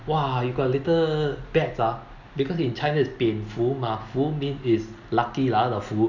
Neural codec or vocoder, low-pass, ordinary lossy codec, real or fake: none; 7.2 kHz; none; real